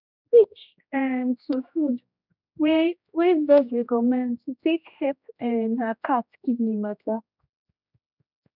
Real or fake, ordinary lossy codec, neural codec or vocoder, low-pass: fake; none; codec, 16 kHz, 1 kbps, X-Codec, HuBERT features, trained on general audio; 5.4 kHz